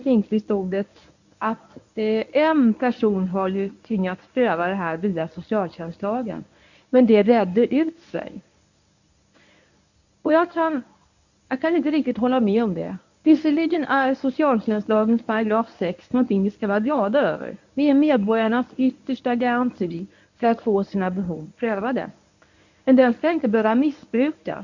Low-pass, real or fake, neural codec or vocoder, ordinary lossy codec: 7.2 kHz; fake; codec, 24 kHz, 0.9 kbps, WavTokenizer, medium speech release version 1; none